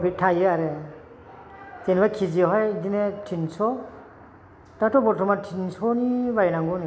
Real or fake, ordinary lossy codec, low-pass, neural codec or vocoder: real; none; none; none